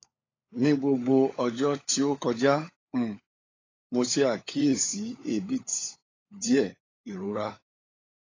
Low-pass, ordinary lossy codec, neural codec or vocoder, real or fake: 7.2 kHz; AAC, 32 kbps; codec, 16 kHz, 16 kbps, FunCodec, trained on LibriTTS, 50 frames a second; fake